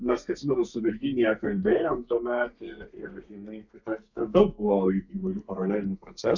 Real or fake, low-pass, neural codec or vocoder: fake; 7.2 kHz; codec, 32 kHz, 1.9 kbps, SNAC